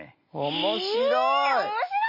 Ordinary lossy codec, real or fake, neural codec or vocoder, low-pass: MP3, 24 kbps; real; none; 5.4 kHz